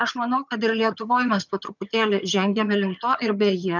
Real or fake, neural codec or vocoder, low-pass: fake; vocoder, 22.05 kHz, 80 mel bands, HiFi-GAN; 7.2 kHz